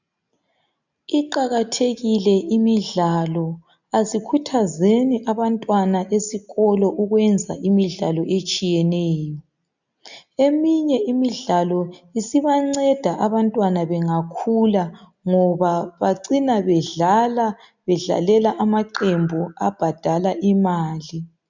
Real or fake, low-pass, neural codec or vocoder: real; 7.2 kHz; none